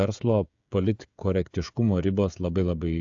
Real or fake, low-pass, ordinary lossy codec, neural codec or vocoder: fake; 7.2 kHz; AAC, 64 kbps; codec, 16 kHz, 16 kbps, FreqCodec, smaller model